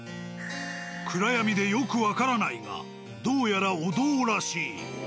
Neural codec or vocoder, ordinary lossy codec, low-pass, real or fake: none; none; none; real